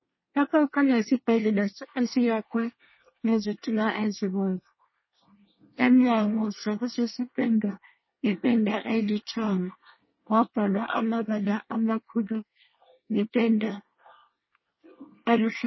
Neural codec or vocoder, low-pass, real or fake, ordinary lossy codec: codec, 24 kHz, 1 kbps, SNAC; 7.2 kHz; fake; MP3, 24 kbps